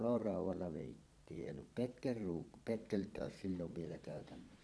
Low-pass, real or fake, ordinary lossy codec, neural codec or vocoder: none; fake; none; vocoder, 22.05 kHz, 80 mel bands, Vocos